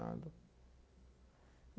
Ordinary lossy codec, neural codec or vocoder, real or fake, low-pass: none; none; real; none